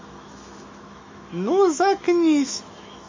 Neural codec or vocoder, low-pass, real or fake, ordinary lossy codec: autoencoder, 48 kHz, 128 numbers a frame, DAC-VAE, trained on Japanese speech; 7.2 kHz; fake; MP3, 32 kbps